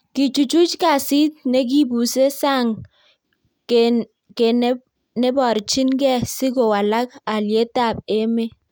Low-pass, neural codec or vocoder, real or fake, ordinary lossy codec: none; none; real; none